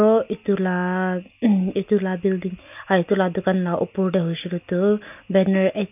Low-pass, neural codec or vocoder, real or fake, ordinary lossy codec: 3.6 kHz; none; real; none